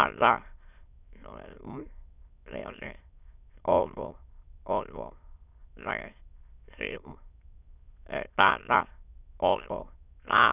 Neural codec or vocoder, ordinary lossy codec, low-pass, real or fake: autoencoder, 22.05 kHz, a latent of 192 numbers a frame, VITS, trained on many speakers; none; 3.6 kHz; fake